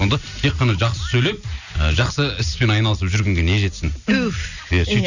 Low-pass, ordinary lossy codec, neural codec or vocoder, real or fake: 7.2 kHz; none; none; real